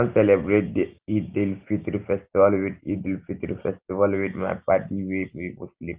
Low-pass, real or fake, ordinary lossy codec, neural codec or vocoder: 3.6 kHz; real; Opus, 32 kbps; none